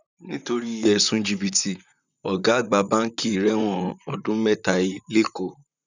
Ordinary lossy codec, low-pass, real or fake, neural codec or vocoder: none; 7.2 kHz; fake; vocoder, 44.1 kHz, 128 mel bands, Pupu-Vocoder